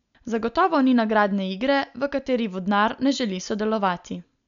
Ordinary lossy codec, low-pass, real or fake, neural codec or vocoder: none; 7.2 kHz; real; none